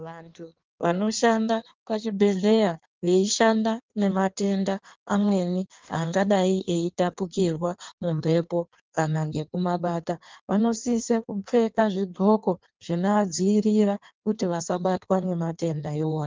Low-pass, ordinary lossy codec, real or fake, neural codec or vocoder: 7.2 kHz; Opus, 32 kbps; fake; codec, 16 kHz in and 24 kHz out, 1.1 kbps, FireRedTTS-2 codec